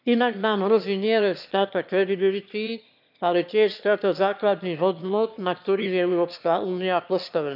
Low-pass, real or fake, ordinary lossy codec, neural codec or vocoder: 5.4 kHz; fake; none; autoencoder, 22.05 kHz, a latent of 192 numbers a frame, VITS, trained on one speaker